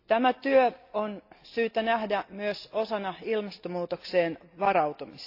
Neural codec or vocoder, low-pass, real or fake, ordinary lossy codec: none; 5.4 kHz; real; AAC, 32 kbps